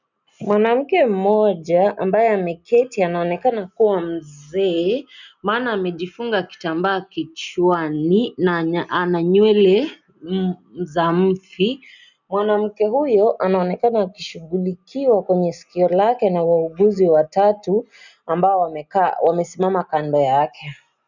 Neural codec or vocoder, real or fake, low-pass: none; real; 7.2 kHz